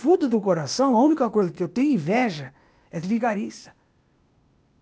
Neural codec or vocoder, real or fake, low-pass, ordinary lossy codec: codec, 16 kHz, 0.8 kbps, ZipCodec; fake; none; none